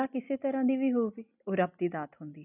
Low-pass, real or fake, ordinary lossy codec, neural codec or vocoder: 3.6 kHz; real; none; none